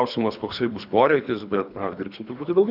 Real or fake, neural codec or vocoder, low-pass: fake; codec, 24 kHz, 3 kbps, HILCodec; 5.4 kHz